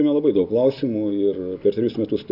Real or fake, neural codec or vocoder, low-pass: real; none; 5.4 kHz